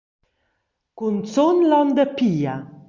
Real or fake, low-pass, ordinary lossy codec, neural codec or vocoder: real; 7.2 kHz; Opus, 64 kbps; none